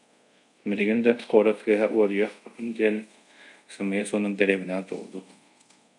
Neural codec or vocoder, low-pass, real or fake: codec, 24 kHz, 0.5 kbps, DualCodec; 10.8 kHz; fake